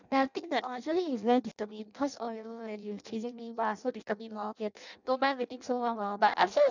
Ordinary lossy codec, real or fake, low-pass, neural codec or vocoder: none; fake; 7.2 kHz; codec, 16 kHz in and 24 kHz out, 0.6 kbps, FireRedTTS-2 codec